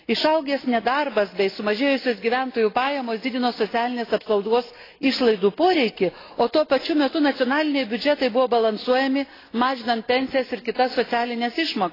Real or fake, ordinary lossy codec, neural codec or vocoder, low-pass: real; AAC, 24 kbps; none; 5.4 kHz